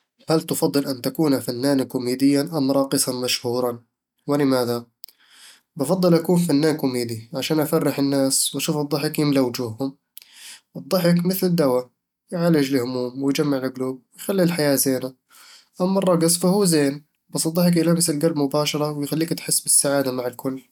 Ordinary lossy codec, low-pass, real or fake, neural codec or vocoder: none; 19.8 kHz; real; none